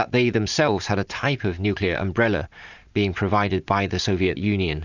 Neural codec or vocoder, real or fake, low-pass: vocoder, 44.1 kHz, 128 mel bands every 512 samples, BigVGAN v2; fake; 7.2 kHz